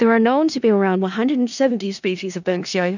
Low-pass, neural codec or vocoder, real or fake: 7.2 kHz; codec, 16 kHz in and 24 kHz out, 0.4 kbps, LongCat-Audio-Codec, four codebook decoder; fake